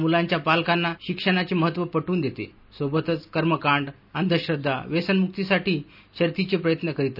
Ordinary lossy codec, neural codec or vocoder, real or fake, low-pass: none; none; real; 5.4 kHz